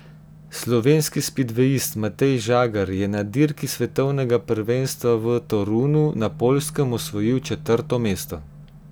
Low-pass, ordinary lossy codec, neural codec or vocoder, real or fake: none; none; none; real